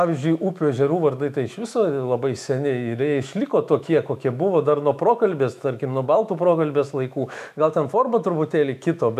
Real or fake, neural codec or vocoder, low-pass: fake; autoencoder, 48 kHz, 128 numbers a frame, DAC-VAE, trained on Japanese speech; 14.4 kHz